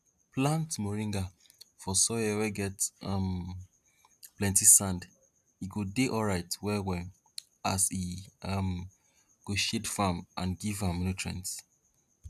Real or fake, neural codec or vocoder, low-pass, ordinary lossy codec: real; none; 14.4 kHz; none